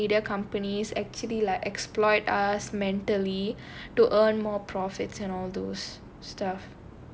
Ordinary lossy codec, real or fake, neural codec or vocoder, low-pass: none; real; none; none